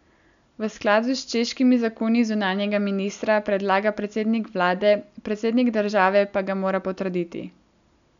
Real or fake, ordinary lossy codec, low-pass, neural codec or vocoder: real; none; 7.2 kHz; none